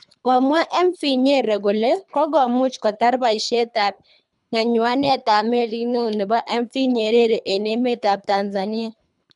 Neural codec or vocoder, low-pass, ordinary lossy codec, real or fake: codec, 24 kHz, 3 kbps, HILCodec; 10.8 kHz; none; fake